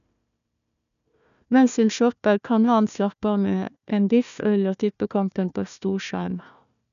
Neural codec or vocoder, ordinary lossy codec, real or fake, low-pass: codec, 16 kHz, 1 kbps, FunCodec, trained on Chinese and English, 50 frames a second; none; fake; 7.2 kHz